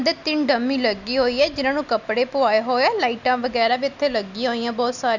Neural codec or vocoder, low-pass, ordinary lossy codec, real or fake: none; 7.2 kHz; none; real